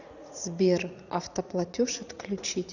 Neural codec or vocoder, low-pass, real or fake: vocoder, 44.1 kHz, 80 mel bands, Vocos; 7.2 kHz; fake